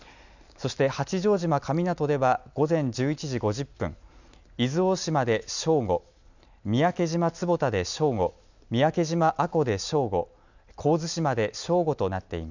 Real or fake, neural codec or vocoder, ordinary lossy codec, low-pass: real; none; none; 7.2 kHz